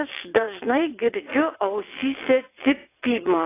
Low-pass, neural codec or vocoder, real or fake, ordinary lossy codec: 3.6 kHz; vocoder, 22.05 kHz, 80 mel bands, WaveNeXt; fake; AAC, 16 kbps